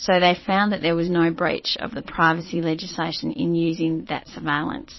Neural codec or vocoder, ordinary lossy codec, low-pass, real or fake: vocoder, 22.05 kHz, 80 mel bands, Vocos; MP3, 24 kbps; 7.2 kHz; fake